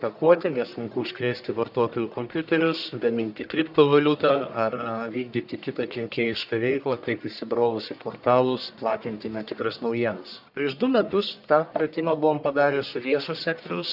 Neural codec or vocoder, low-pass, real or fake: codec, 44.1 kHz, 1.7 kbps, Pupu-Codec; 5.4 kHz; fake